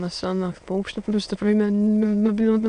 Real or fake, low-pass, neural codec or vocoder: fake; 9.9 kHz; autoencoder, 22.05 kHz, a latent of 192 numbers a frame, VITS, trained on many speakers